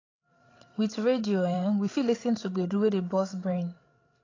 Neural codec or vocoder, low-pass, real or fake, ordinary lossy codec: codec, 16 kHz, 16 kbps, FreqCodec, larger model; 7.2 kHz; fake; AAC, 32 kbps